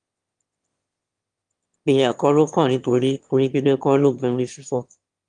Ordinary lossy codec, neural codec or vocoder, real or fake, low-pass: Opus, 32 kbps; autoencoder, 22.05 kHz, a latent of 192 numbers a frame, VITS, trained on one speaker; fake; 9.9 kHz